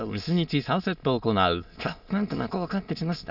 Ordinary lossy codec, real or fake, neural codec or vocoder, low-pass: none; fake; autoencoder, 22.05 kHz, a latent of 192 numbers a frame, VITS, trained on many speakers; 5.4 kHz